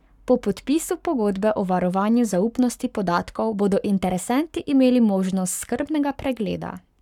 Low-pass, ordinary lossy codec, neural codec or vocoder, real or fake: 19.8 kHz; none; codec, 44.1 kHz, 7.8 kbps, Pupu-Codec; fake